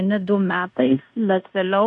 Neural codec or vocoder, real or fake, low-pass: codec, 16 kHz in and 24 kHz out, 0.9 kbps, LongCat-Audio-Codec, fine tuned four codebook decoder; fake; 10.8 kHz